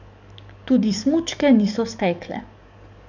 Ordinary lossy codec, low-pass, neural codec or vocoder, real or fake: none; 7.2 kHz; codec, 44.1 kHz, 7.8 kbps, DAC; fake